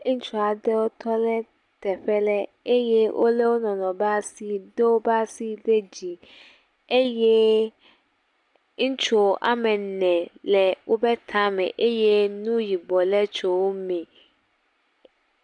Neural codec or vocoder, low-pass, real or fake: none; 10.8 kHz; real